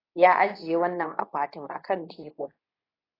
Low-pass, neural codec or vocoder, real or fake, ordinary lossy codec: 5.4 kHz; codec, 24 kHz, 0.9 kbps, WavTokenizer, medium speech release version 2; fake; AAC, 24 kbps